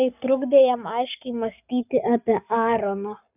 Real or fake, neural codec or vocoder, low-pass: fake; codec, 44.1 kHz, 7.8 kbps, Pupu-Codec; 3.6 kHz